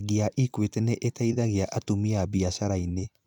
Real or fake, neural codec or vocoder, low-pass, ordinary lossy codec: fake; vocoder, 44.1 kHz, 128 mel bands every 256 samples, BigVGAN v2; 19.8 kHz; none